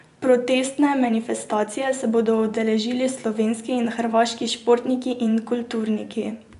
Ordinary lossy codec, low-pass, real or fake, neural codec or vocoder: none; 10.8 kHz; fake; vocoder, 24 kHz, 100 mel bands, Vocos